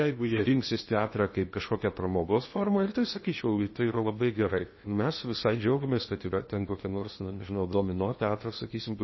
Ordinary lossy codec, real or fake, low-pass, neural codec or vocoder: MP3, 24 kbps; fake; 7.2 kHz; codec, 16 kHz in and 24 kHz out, 0.8 kbps, FocalCodec, streaming, 65536 codes